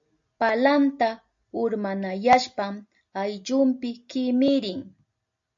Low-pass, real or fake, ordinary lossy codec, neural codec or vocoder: 7.2 kHz; real; AAC, 64 kbps; none